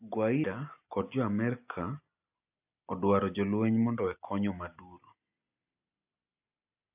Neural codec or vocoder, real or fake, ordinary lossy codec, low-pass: none; real; AAC, 32 kbps; 3.6 kHz